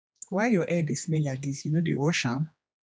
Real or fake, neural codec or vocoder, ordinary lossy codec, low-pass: fake; codec, 16 kHz, 2 kbps, X-Codec, HuBERT features, trained on general audio; none; none